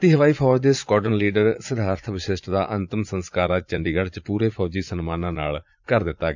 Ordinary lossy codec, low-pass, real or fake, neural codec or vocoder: none; 7.2 kHz; fake; vocoder, 44.1 kHz, 80 mel bands, Vocos